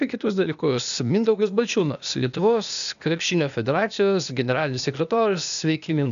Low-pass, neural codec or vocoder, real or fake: 7.2 kHz; codec, 16 kHz, 0.8 kbps, ZipCodec; fake